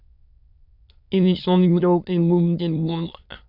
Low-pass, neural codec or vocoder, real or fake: 5.4 kHz; autoencoder, 22.05 kHz, a latent of 192 numbers a frame, VITS, trained on many speakers; fake